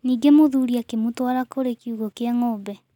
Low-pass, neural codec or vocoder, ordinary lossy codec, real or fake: 19.8 kHz; none; none; real